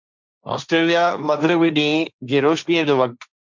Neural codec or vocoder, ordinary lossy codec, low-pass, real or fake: codec, 16 kHz, 1.1 kbps, Voila-Tokenizer; MP3, 64 kbps; 7.2 kHz; fake